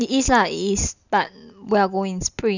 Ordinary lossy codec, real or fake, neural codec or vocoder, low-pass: none; real; none; 7.2 kHz